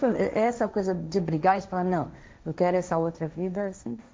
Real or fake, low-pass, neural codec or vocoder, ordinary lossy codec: fake; none; codec, 16 kHz, 1.1 kbps, Voila-Tokenizer; none